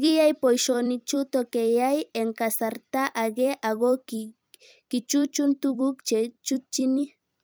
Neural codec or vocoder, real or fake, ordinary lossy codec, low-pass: vocoder, 44.1 kHz, 128 mel bands every 256 samples, BigVGAN v2; fake; none; none